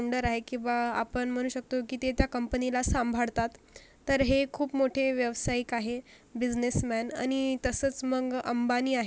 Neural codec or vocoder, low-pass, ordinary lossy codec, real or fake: none; none; none; real